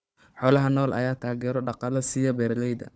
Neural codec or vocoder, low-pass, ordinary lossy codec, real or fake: codec, 16 kHz, 4 kbps, FunCodec, trained on Chinese and English, 50 frames a second; none; none; fake